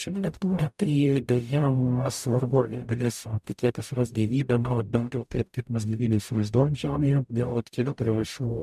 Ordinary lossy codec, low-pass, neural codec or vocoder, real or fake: MP3, 96 kbps; 14.4 kHz; codec, 44.1 kHz, 0.9 kbps, DAC; fake